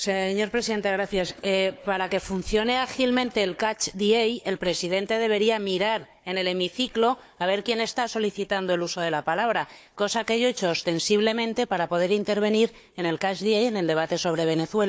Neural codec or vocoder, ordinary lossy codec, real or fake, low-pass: codec, 16 kHz, 4 kbps, FunCodec, trained on Chinese and English, 50 frames a second; none; fake; none